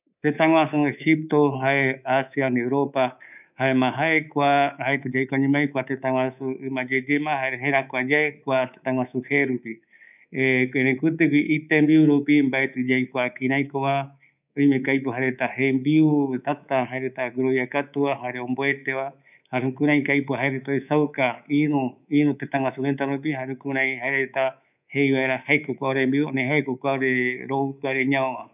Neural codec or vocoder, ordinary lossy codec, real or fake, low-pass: codec, 24 kHz, 3.1 kbps, DualCodec; none; fake; 3.6 kHz